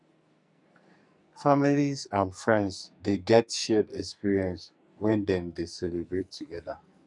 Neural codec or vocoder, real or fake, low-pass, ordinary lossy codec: codec, 44.1 kHz, 2.6 kbps, SNAC; fake; 10.8 kHz; none